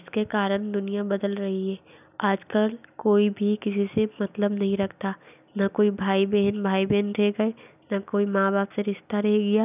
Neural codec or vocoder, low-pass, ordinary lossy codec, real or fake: none; 3.6 kHz; none; real